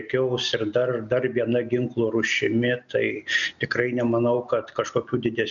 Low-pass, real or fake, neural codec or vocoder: 7.2 kHz; real; none